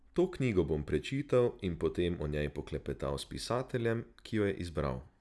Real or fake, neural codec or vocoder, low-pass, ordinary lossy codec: real; none; none; none